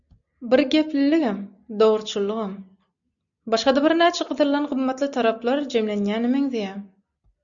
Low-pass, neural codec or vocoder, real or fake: 7.2 kHz; none; real